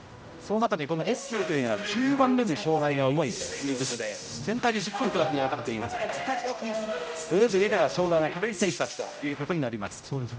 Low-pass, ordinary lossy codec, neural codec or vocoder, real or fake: none; none; codec, 16 kHz, 0.5 kbps, X-Codec, HuBERT features, trained on general audio; fake